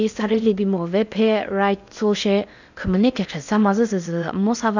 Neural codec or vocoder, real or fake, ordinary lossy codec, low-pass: codec, 16 kHz in and 24 kHz out, 0.8 kbps, FocalCodec, streaming, 65536 codes; fake; none; 7.2 kHz